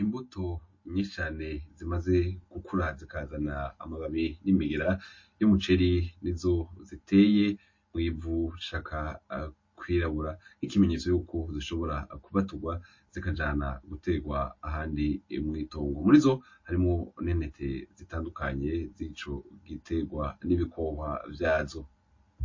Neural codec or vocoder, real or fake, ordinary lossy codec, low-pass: none; real; MP3, 32 kbps; 7.2 kHz